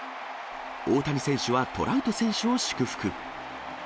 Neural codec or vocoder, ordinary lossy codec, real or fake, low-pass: none; none; real; none